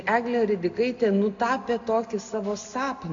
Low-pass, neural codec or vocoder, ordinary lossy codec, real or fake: 7.2 kHz; none; MP3, 64 kbps; real